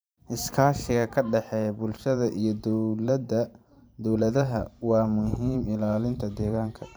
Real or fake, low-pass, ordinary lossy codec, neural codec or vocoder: fake; none; none; vocoder, 44.1 kHz, 128 mel bands every 512 samples, BigVGAN v2